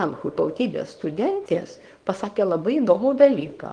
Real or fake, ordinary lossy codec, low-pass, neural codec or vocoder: fake; Opus, 24 kbps; 9.9 kHz; codec, 24 kHz, 0.9 kbps, WavTokenizer, small release